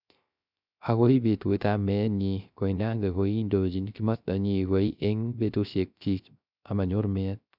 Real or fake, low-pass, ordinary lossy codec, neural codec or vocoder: fake; 5.4 kHz; none; codec, 16 kHz, 0.3 kbps, FocalCodec